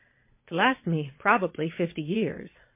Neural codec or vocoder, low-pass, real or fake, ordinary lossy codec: vocoder, 22.05 kHz, 80 mel bands, Vocos; 3.6 kHz; fake; MP3, 24 kbps